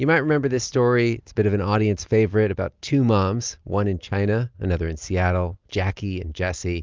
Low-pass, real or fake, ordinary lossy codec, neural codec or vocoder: 7.2 kHz; real; Opus, 32 kbps; none